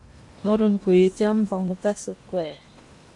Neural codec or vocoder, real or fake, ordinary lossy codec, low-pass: codec, 16 kHz in and 24 kHz out, 0.8 kbps, FocalCodec, streaming, 65536 codes; fake; MP3, 64 kbps; 10.8 kHz